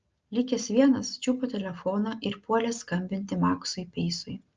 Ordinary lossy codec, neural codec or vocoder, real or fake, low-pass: Opus, 24 kbps; none; real; 7.2 kHz